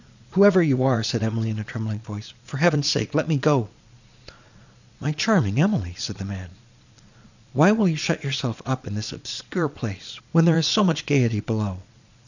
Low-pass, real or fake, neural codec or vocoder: 7.2 kHz; fake; vocoder, 22.05 kHz, 80 mel bands, Vocos